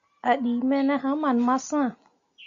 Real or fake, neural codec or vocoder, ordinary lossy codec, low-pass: real; none; MP3, 64 kbps; 7.2 kHz